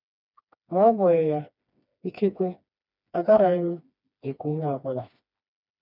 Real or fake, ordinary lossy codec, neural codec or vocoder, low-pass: fake; none; codec, 16 kHz, 2 kbps, FreqCodec, smaller model; 5.4 kHz